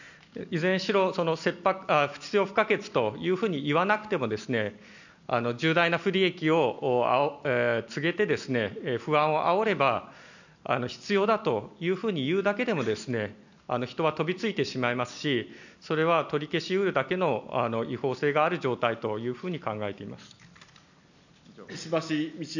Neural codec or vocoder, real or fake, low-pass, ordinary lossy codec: none; real; 7.2 kHz; none